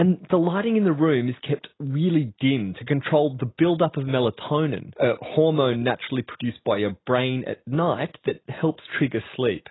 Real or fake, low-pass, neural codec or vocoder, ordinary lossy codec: real; 7.2 kHz; none; AAC, 16 kbps